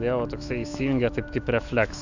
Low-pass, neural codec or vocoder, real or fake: 7.2 kHz; autoencoder, 48 kHz, 128 numbers a frame, DAC-VAE, trained on Japanese speech; fake